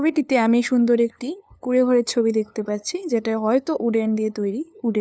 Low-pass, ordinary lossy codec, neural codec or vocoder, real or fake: none; none; codec, 16 kHz, 2 kbps, FunCodec, trained on LibriTTS, 25 frames a second; fake